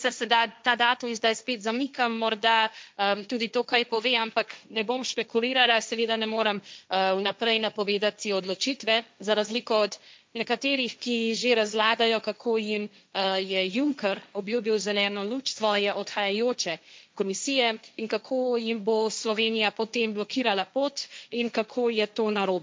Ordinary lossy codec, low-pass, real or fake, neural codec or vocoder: none; none; fake; codec, 16 kHz, 1.1 kbps, Voila-Tokenizer